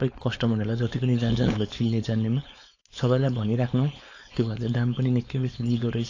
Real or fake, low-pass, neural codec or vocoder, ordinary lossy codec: fake; 7.2 kHz; codec, 16 kHz, 4.8 kbps, FACodec; AAC, 48 kbps